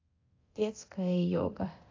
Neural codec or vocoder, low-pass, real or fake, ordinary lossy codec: codec, 24 kHz, 0.9 kbps, DualCodec; 7.2 kHz; fake; AAC, 32 kbps